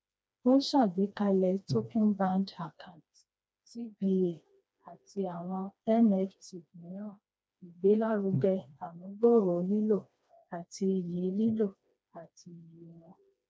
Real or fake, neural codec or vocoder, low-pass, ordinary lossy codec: fake; codec, 16 kHz, 2 kbps, FreqCodec, smaller model; none; none